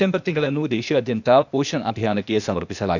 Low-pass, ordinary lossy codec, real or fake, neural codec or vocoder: 7.2 kHz; none; fake; codec, 16 kHz, 0.8 kbps, ZipCodec